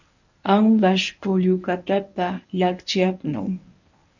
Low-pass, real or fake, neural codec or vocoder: 7.2 kHz; fake; codec, 24 kHz, 0.9 kbps, WavTokenizer, medium speech release version 1